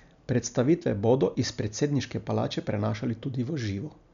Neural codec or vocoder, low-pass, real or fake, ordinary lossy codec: none; 7.2 kHz; real; none